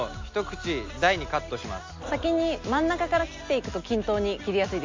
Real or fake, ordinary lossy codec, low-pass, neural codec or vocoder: real; MP3, 48 kbps; 7.2 kHz; none